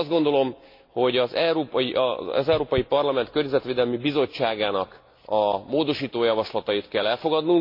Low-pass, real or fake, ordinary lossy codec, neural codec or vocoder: 5.4 kHz; real; MP3, 32 kbps; none